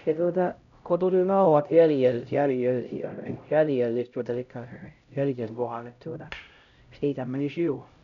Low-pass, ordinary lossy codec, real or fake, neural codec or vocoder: 7.2 kHz; none; fake; codec, 16 kHz, 0.5 kbps, X-Codec, HuBERT features, trained on LibriSpeech